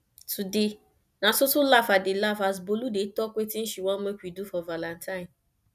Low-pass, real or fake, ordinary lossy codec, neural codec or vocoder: 14.4 kHz; real; none; none